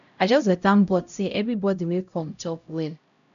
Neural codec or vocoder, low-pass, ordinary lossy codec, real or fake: codec, 16 kHz, 0.5 kbps, X-Codec, HuBERT features, trained on LibriSpeech; 7.2 kHz; none; fake